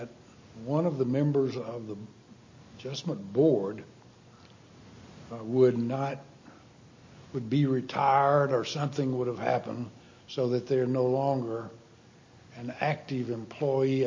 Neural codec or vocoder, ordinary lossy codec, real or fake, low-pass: none; MP3, 32 kbps; real; 7.2 kHz